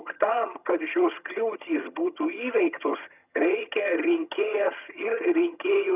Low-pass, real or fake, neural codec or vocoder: 3.6 kHz; fake; vocoder, 22.05 kHz, 80 mel bands, HiFi-GAN